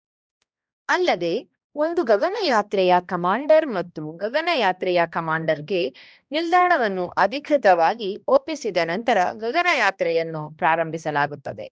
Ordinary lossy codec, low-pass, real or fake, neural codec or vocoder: none; none; fake; codec, 16 kHz, 1 kbps, X-Codec, HuBERT features, trained on balanced general audio